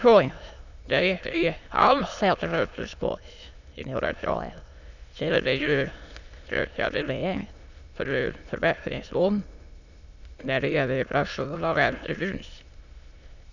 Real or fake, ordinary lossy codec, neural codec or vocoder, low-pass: fake; none; autoencoder, 22.05 kHz, a latent of 192 numbers a frame, VITS, trained on many speakers; 7.2 kHz